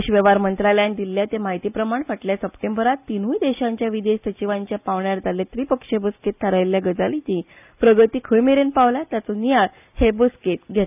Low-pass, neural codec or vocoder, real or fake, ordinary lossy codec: 3.6 kHz; none; real; none